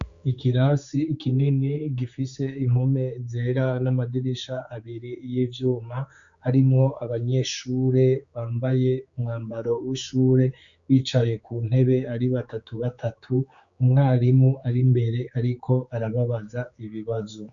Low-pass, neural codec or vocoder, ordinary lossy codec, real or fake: 7.2 kHz; codec, 16 kHz, 4 kbps, X-Codec, HuBERT features, trained on general audio; Opus, 64 kbps; fake